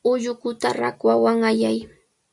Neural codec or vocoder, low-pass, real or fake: none; 10.8 kHz; real